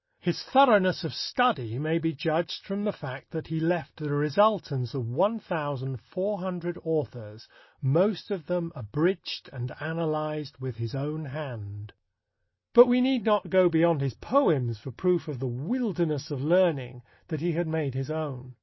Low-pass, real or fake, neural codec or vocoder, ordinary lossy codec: 7.2 kHz; real; none; MP3, 24 kbps